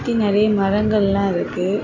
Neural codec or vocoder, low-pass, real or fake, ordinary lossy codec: none; 7.2 kHz; real; none